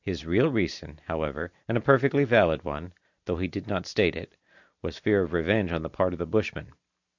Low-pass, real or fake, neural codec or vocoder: 7.2 kHz; real; none